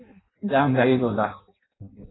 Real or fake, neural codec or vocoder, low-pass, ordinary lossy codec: fake; codec, 16 kHz in and 24 kHz out, 0.6 kbps, FireRedTTS-2 codec; 7.2 kHz; AAC, 16 kbps